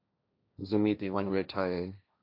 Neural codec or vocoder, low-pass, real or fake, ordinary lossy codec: codec, 16 kHz, 1.1 kbps, Voila-Tokenizer; 5.4 kHz; fake; AAC, 32 kbps